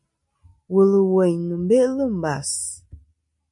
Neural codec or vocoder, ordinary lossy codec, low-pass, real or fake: none; MP3, 64 kbps; 10.8 kHz; real